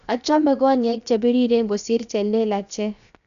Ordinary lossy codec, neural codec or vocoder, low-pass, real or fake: none; codec, 16 kHz, 0.7 kbps, FocalCodec; 7.2 kHz; fake